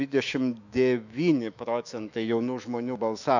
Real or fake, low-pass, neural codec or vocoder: fake; 7.2 kHz; autoencoder, 48 kHz, 128 numbers a frame, DAC-VAE, trained on Japanese speech